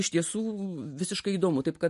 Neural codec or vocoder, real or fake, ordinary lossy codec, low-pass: none; real; MP3, 48 kbps; 14.4 kHz